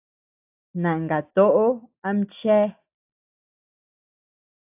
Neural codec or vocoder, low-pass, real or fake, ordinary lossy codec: none; 3.6 kHz; real; AAC, 32 kbps